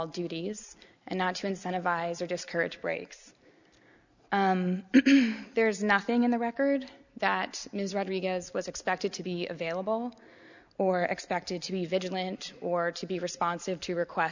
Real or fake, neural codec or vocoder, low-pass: real; none; 7.2 kHz